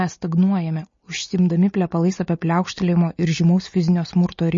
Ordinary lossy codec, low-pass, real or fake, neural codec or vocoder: MP3, 32 kbps; 7.2 kHz; real; none